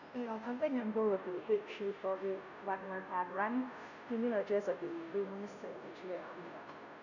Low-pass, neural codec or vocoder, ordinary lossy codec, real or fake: 7.2 kHz; codec, 16 kHz, 0.5 kbps, FunCodec, trained on Chinese and English, 25 frames a second; none; fake